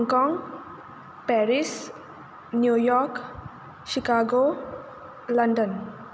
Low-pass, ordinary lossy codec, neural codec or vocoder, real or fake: none; none; none; real